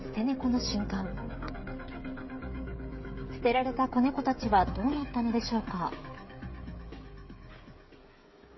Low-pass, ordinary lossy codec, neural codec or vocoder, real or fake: 7.2 kHz; MP3, 24 kbps; codec, 16 kHz, 16 kbps, FreqCodec, smaller model; fake